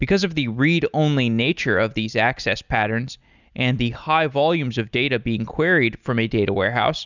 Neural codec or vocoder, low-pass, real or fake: none; 7.2 kHz; real